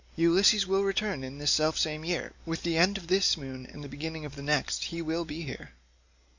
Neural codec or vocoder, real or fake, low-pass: none; real; 7.2 kHz